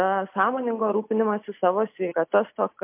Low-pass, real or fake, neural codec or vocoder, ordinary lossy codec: 3.6 kHz; real; none; MP3, 32 kbps